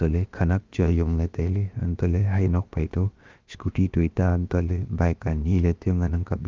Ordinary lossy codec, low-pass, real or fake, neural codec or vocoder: Opus, 32 kbps; 7.2 kHz; fake; codec, 16 kHz, about 1 kbps, DyCAST, with the encoder's durations